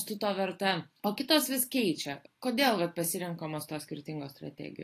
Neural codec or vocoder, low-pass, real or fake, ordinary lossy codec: none; 14.4 kHz; real; AAC, 48 kbps